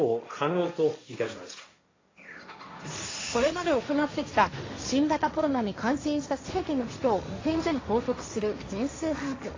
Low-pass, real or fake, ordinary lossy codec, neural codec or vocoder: 7.2 kHz; fake; AAC, 32 kbps; codec, 16 kHz, 1.1 kbps, Voila-Tokenizer